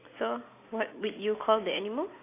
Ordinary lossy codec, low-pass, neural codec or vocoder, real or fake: AAC, 24 kbps; 3.6 kHz; none; real